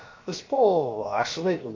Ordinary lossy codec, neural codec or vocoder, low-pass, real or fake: MP3, 48 kbps; codec, 16 kHz, about 1 kbps, DyCAST, with the encoder's durations; 7.2 kHz; fake